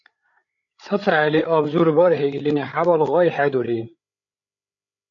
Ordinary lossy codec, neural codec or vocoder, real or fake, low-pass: AAC, 48 kbps; codec, 16 kHz, 8 kbps, FreqCodec, larger model; fake; 7.2 kHz